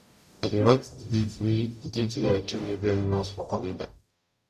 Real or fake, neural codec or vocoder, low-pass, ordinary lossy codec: fake; codec, 44.1 kHz, 0.9 kbps, DAC; 14.4 kHz; none